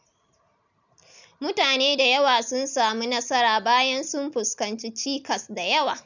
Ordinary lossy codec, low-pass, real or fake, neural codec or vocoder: none; 7.2 kHz; real; none